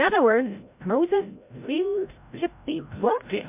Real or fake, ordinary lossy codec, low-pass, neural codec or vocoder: fake; MP3, 32 kbps; 3.6 kHz; codec, 16 kHz, 0.5 kbps, FreqCodec, larger model